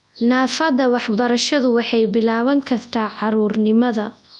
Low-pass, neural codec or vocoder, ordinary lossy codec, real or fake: 10.8 kHz; codec, 24 kHz, 0.9 kbps, WavTokenizer, large speech release; none; fake